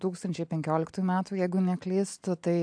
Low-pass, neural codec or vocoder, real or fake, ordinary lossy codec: 9.9 kHz; none; real; AAC, 64 kbps